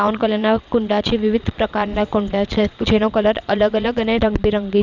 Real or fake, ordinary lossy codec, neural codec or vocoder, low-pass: fake; none; vocoder, 22.05 kHz, 80 mel bands, Vocos; 7.2 kHz